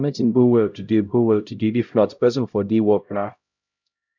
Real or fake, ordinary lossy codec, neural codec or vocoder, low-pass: fake; none; codec, 16 kHz, 0.5 kbps, X-Codec, HuBERT features, trained on LibriSpeech; 7.2 kHz